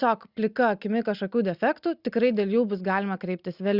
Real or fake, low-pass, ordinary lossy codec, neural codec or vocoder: real; 5.4 kHz; Opus, 64 kbps; none